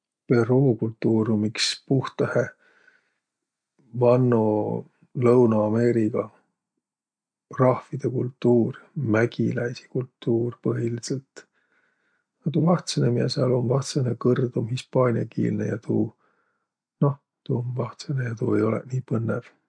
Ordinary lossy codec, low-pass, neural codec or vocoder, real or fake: MP3, 64 kbps; 9.9 kHz; none; real